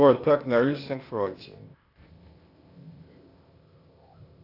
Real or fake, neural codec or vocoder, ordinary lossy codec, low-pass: fake; codec, 16 kHz, 0.8 kbps, ZipCodec; AAC, 32 kbps; 5.4 kHz